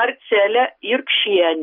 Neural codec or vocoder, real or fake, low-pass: none; real; 5.4 kHz